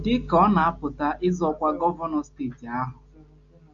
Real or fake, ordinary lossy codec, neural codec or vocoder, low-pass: real; AAC, 64 kbps; none; 7.2 kHz